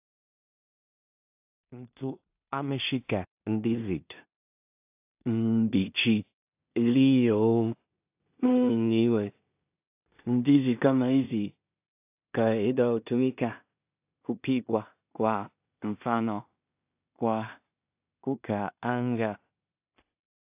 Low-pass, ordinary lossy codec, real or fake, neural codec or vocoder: 3.6 kHz; AAC, 32 kbps; fake; codec, 16 kHz in and 24 kHz out, 0.4 kbps, LongCat-Audio-Codec, two codebook decoder